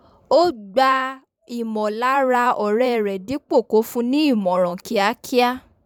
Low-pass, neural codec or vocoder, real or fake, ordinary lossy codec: 19.8 kHz; vocoder, 44.1 kHz, 128 mel bands every 512 samples, BigVGAN v2; fake; none